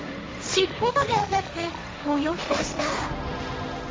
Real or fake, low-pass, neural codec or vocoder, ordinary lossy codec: fake; none; codec, 16 kHz, 1.1 kbps, Voila-Tokenizer; none